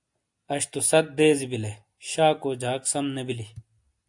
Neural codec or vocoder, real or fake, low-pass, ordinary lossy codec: none; real; 10.8 kHz; AAC, 64 kbps